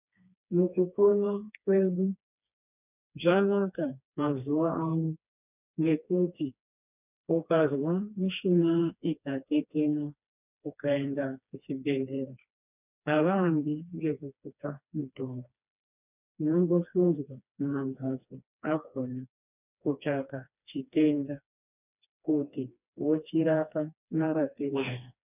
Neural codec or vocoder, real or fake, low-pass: codec, 16 kHz, 2 kbps, FreqCodec, smaller model; fake; 3.6 kHz